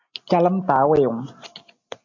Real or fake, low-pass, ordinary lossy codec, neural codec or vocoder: real; 7.2 kHz; MP3, 48 kbps; none